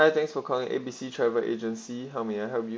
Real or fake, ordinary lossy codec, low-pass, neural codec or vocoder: real; none; none; none